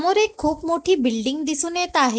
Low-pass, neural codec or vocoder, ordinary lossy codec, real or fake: none; none; none; real